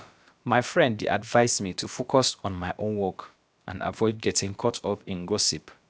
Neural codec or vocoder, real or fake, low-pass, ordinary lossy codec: codec, 16 kHz, about 1 kbps, DyCAST, with the encoder's durations; fake; none; none